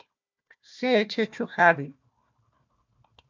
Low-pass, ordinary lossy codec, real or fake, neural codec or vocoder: 7.2 kHz; MP3, 64 kbps; fake; codec, 16 kHz, 1 kbps, FunCodec, trained on Chinese and English, 50 frames a second